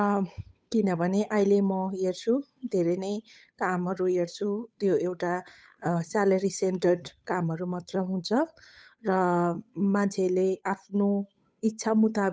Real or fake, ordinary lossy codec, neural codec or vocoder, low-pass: fake; none; codec, 16 kHz, 8 kbps, FunCodec, trained on Chinese and English, 25 frames a second; none